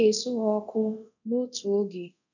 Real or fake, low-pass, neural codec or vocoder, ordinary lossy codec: fake; 7.2 kHz; codec, 24 kHz, 0.9 kbps, DualCodec; none